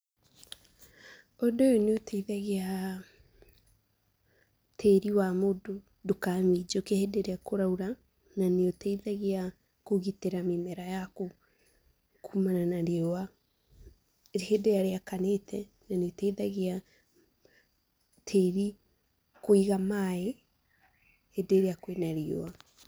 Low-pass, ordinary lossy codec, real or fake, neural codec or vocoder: none; none; real; none